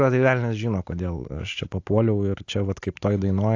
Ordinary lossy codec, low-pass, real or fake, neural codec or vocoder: AAC, 48 kbps; 7.2 kHz; real; none